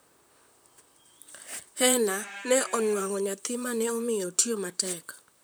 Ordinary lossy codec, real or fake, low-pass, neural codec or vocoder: none; fake; none; vocoder, 44.1 kHz, 128 mel bands, Pupu-Vocoder